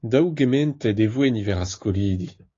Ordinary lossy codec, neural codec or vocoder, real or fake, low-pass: AAC, 32 kbps; codec, 16 kHz, 6 kbps, DAC; fake; 7.2 kHz